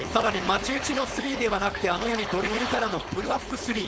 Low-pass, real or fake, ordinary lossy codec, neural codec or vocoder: none; fake; none; codec, 16 kHz, 4.8 kbps, FACodec